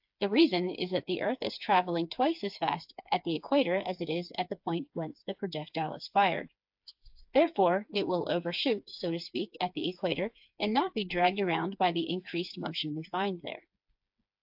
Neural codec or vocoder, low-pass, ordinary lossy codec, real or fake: codec, 16 kHz, 4 kbps, FreqCodec, smaller model; 5.4 kHz; AAC, 48 kbps; fake